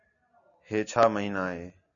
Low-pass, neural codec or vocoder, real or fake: 7.2 kHz; none; real